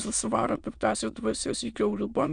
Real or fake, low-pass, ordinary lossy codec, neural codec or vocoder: fake; 9.9 kHz; MP3, 96 kbps; autoencoder, 22.05 kHz, a latent of 192 numbers a frame, VITS, trained on many speakers